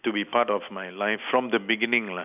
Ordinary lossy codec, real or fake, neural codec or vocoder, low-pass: none; real; none; 3.6 kHz